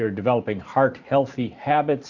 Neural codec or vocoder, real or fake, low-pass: vocoder, 44.1 kHz, 128 mel bands every 512 samples, BigVGAN v2; fake; 7.2 kHz